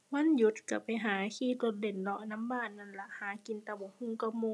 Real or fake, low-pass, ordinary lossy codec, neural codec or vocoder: real; none; none; none